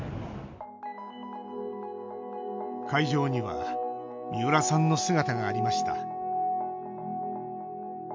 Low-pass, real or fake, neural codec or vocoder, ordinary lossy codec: 7.2 kHz; real; none; MP3, 48 kbps